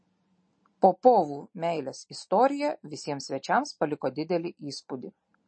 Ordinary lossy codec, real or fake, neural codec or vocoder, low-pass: MP3, 32 kbps; real; none; 9.9 kHz